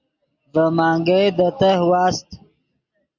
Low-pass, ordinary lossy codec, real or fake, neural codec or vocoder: 7.2 kHz; Opus, 64 kbps; real; none